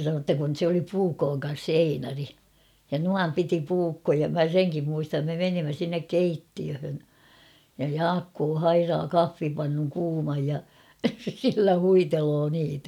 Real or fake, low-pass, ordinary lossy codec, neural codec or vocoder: real; 19.8 kHz; none; none